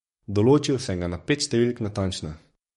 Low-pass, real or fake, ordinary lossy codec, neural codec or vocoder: 19.8 kHz; fake; MP3, 48 kbps; codec, 44.1 kHz, 7.8 kbps, DAC